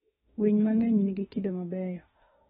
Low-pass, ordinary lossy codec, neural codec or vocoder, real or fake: 19.8 kHz; AAC, 16 kbps; autoencoder, 48 kHz, 32 numbers a frame, DAC-VAE, trained on Japanese speech; fake